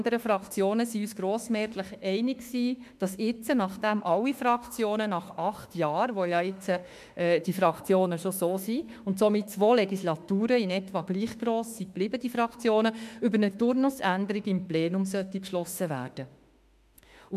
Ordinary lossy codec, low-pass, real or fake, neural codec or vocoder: MP3, 96 kbps; 14.4 kHz; fake; autoencoder, 48 kHz, 32 numbers a frame, DAC-VAE, trained on Japanese speech